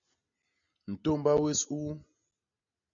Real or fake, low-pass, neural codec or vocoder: real; 7.2 kHz; none